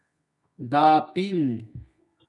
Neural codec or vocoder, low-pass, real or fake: codec, 24 kHz, 0.9 kbps, WavTokenizer, medium music audio release; 10.8 kHz; fake